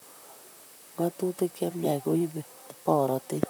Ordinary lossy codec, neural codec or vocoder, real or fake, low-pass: none; vocoder, 44.1 kHz, 128 mel bands, Pupu-Vocoder; fake; none